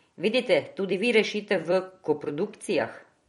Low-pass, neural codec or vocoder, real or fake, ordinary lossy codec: 19.8 kHz; vocoder, 44.1 kHz, 128 mel bands every 256 samples, BigVGAN v2; fake; MP3, 48 kbps